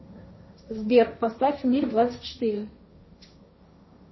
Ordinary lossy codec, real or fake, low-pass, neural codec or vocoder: MP3, 24 kbps; fake; 7.2 kHz; codec, 16 kHz, 1.1 kbps, Voila-Tokenizer